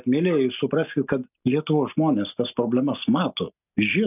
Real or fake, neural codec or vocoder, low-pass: real; none; 3.6 kHz